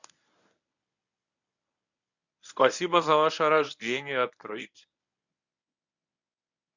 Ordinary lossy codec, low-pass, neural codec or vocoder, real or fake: none; 7.2 kHz; codec, 24 kHz, 0.9 kbps, WavTokenizer, medium speech release version 1; fake